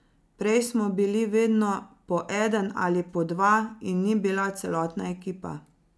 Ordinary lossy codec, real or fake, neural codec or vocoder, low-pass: none; real; none; none